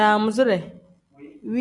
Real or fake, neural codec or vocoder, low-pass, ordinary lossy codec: real; none; 10.8 kHz; AAC, 64 kbps